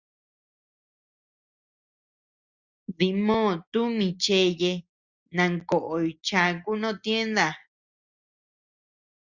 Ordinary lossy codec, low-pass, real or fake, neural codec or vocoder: Opus, 64 kbps; 7.2 kHz; real; none